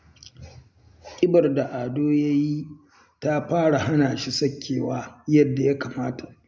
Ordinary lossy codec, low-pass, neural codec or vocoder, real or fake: none; none; none; real